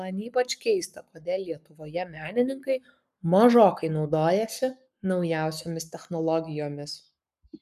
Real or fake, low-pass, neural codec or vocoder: fake; 14.4 kHz; codec, 44.1 kHz, 7.8 kbps, Pupu-Codec